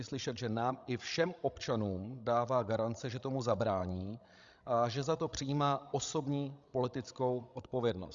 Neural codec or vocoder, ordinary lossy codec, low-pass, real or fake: codec, 16 kHz, 16 kbps, FreqCodec, larger model; Opus, 64 kbps; 7.2 kHz; fake